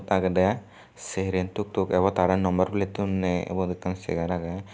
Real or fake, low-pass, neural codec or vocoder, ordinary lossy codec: real; none; none; none